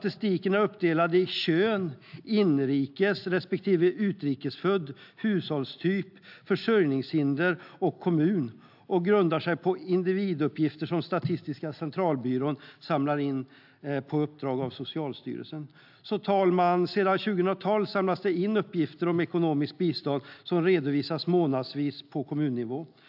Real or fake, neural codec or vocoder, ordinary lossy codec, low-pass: real; none; AAC, 48 kbps; 5.4 kHz